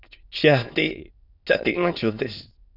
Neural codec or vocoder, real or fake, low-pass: autoencoder, 22.05 kHz, a latent of 192 numbers a frame, VITS, trained on many speakers; fake; 5.4 kHz